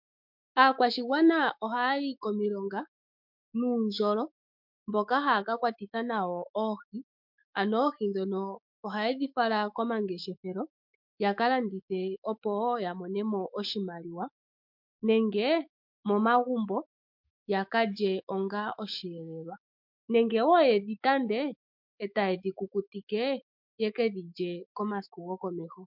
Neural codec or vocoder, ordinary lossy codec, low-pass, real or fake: autoencoder, 48 kHz, 128 numbers a frame, DAC-VAE, trained on Japanese speech; MP3, 48 kbps; 5.4 kHz; fake